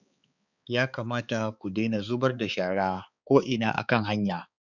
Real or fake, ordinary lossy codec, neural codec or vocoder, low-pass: fake; none; codec, 16 kHz, 4 kbps, X-Codec, HuBERT features, trained on balanced general audio; 7.2 kHz